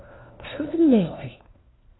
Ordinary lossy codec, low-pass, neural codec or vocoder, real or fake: AAC, 16 kbps; 7.2 kHz; codec, 16 kHz, 1 kbps, FunCodec, trained on LibriTTS, 50 frames a second; fake